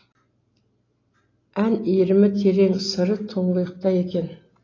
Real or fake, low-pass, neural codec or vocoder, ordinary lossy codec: real; 7.2 kHz; none; AAC, 32 kbps